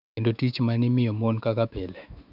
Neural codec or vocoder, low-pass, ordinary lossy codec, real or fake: autoencoder, 48 kHz, 128 numbers a frame, DAC-VAE, trained on Japanese speech; 5.4 kHz; none; fake